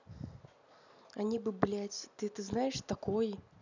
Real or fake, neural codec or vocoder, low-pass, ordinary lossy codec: real; none; 7.2 kHz; none